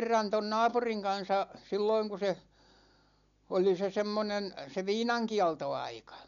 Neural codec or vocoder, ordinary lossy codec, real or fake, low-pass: none; none; real; 7.2 kHz